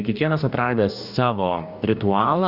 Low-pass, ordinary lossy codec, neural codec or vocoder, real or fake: 5.4 kHz; Opus, 64 kbps; codec, 44.1 kHz, 2.6 kbps, DAC; fake